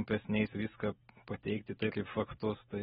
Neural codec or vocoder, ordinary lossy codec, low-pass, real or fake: none; AAC, 16 kbps; 19.8 kHz; real